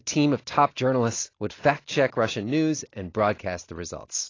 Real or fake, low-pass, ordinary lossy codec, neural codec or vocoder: real; 7.2 kHz; AAC, 32 kbps; none